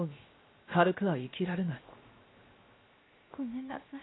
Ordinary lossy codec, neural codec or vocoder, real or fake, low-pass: AAC, 16 kbps; codec, 16 kHz, 0.7 kbps, FocalCodec; fake; 7.2 kHz